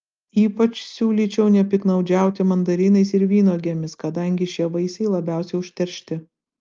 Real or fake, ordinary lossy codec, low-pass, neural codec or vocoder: real; Opus, 32 kbps; 7.2 kHz; none